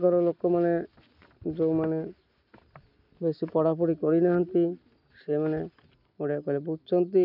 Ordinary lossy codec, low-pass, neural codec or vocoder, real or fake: none; 5.4 kHz; none; real